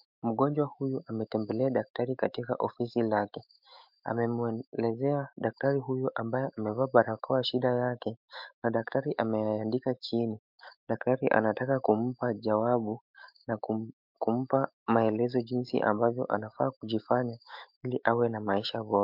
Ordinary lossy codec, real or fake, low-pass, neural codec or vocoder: MP3, 48 kbps; fake; 5.4 kHz; autoencoder, 48 kHz, 128 numbers a frame, DAC-VAE, trained on Japanese speech